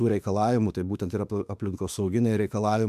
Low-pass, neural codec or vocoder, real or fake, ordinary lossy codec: 14.4 kHz; autoencoder, 48 kHz, 32 numbers a frame, DAC-VAE, trained on Japanese speech; fake; MP3, 96 kbps